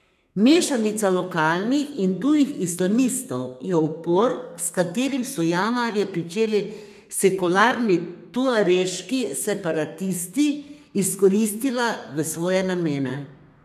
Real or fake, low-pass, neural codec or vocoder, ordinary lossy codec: fake; 14.4 kHz; codec, 32 kHz, 1.9 kbps, SNAC; none